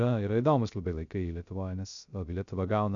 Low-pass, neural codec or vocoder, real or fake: 7.2 kHz; codec, 16 kHz, 0.3 kbps, FocalCodec; fake